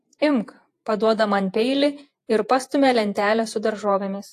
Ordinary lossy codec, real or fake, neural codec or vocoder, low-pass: AAC, 64 kbps; fake; vocoder, 48 kHz, 128 mel bands, Vocos; 14.4 kHz